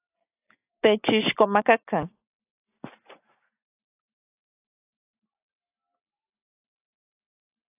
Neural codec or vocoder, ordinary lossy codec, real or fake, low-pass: none; AAC, 32 kbps; real; 3.6 kHz